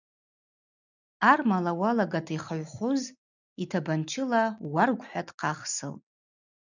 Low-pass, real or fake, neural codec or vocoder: 7.2 kHz; real; none